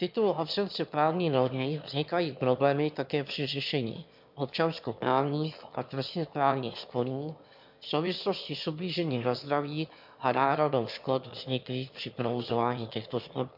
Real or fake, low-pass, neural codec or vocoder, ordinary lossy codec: fake; 5.4 kHz; autoencoder, 22.05 kHz, a latent of 192 numbers a frame, VITS, trained on one speaker; MP3, 48 kbps